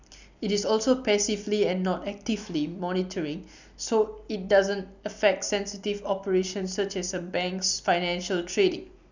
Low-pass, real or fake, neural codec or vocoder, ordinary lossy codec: 7.2 kHz; real; none; none